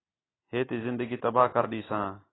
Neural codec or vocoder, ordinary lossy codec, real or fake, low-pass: none; AAC, 16 kbps; real; 7.2 kHz